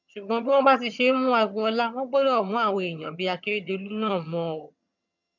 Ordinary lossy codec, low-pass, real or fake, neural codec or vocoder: none; 7.2 kHz; fake; vocoder, 22.05 kHz, 80 mel bands, HiFi-GAN